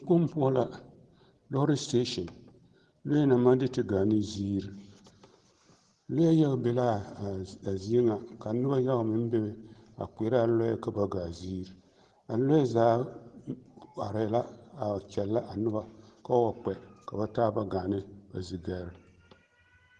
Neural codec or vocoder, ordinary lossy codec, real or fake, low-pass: none; Opus, 16 kbps; real; 10.8 kHz